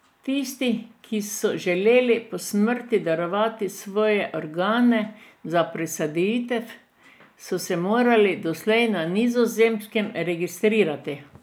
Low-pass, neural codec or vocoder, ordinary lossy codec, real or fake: none; none; none; real